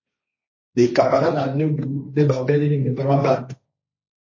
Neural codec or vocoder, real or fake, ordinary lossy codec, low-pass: codec, 16 kHz, 1.1 kbps, Voila-Tokenizer; fake; MP3, 32 kbps; 7.2 kHz